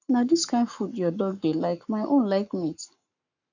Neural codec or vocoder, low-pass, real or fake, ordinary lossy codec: codec, 44.1 kHz, 7.8 kbps, Pupu-Codec; 7.2 kHz; fake; none